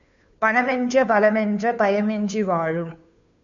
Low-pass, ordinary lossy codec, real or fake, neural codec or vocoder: 7.2 kHz; MP3, 96 kbps; fake; codec, 16 kHz, 2 kbps, FunCodec, trained on Chinese and English, 25 frames a second